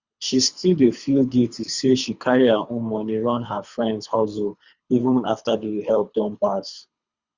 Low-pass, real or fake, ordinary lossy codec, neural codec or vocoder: 7.2 kHz; fake; Opus, 64 kbps; codec, 24 kHz, 3 kbps, HILCodec